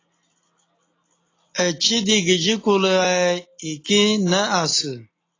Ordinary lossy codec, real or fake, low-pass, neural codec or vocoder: AAC, 32 kbps; real; 7.2 kHz; none